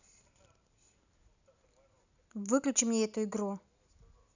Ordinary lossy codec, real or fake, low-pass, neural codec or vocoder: none; real; 7.2 kHz; none